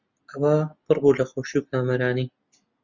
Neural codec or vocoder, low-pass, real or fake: none; 7.2 kHz; real